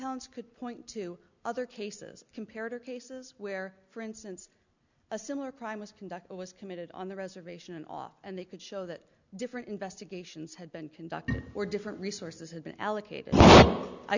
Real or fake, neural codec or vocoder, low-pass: real; none; 7.2 kHz